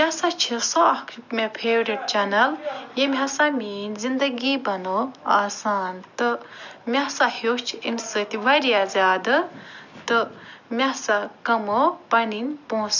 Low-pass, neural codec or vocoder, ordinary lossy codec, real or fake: 7.2 kHz; none; none; real